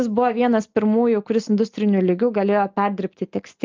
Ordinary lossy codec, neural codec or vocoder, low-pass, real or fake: Opus, 24 kbps; none; 7.2 kHz; real